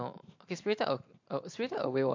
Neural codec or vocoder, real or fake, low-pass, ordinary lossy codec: vocoder, 44.1 kHz, 128 mel bands every 512 samples, BigVGAN v2; fake; 7.2 kHz; MP3, 48 kbps